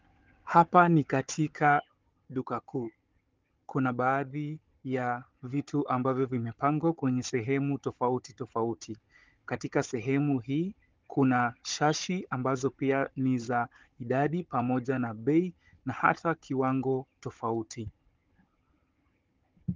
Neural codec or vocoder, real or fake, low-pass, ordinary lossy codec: codec, 16 kHz, 16 kbps, FunCodec, trained on Chinese and English, 50 frames a second; fake; 7.2 kHz; Opus, 32 kbps